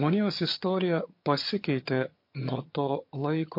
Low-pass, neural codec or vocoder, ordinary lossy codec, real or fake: 5.4 kHz; vocoder, 22.05 kHz, 80 mel bands, HiFi-GAN; MP3, 32 kbps; fake